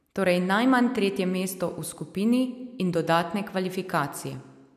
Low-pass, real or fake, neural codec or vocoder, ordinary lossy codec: 14.4 kHz; real; none; none